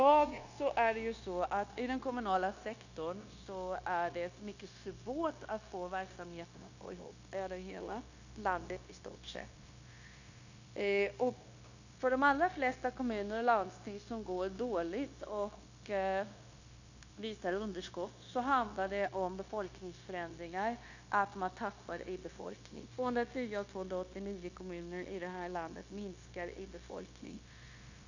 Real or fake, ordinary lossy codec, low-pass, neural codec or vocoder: fake; none; 7.2 kHz; codec, 16 kHz, 0.9 kbps, LongCat-Audio-Codec